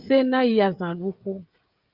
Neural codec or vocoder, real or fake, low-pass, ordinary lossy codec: codec, 16 kHz, 16 kbps, FreqCodec, smaller model; fake; 5.4 kHz; Opus, 24 kbps